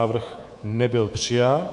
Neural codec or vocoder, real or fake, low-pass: codec, 24 kHz, 3.1 kbps, DualCodec; fake; 10.8 kHz